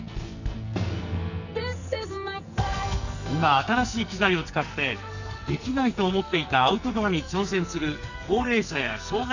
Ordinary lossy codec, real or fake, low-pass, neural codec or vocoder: none; fake; 7.2 kHz; codec, 44.1 kHz, 2.6 kbps, SNAC